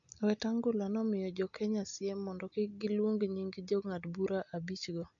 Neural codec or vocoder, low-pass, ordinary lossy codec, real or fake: none; 7.2 kHz; AAC, 64 kbps; real